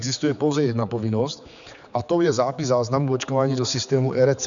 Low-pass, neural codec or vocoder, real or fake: 7.2 kHz; codec, 16 kHz, 4 kbps, X-Codec, HuBERT features, trained on general audio; fake